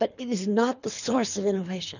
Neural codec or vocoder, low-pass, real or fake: codec, 24 kHz, 3 kbps, HILCodec; 7.2 kHz; fake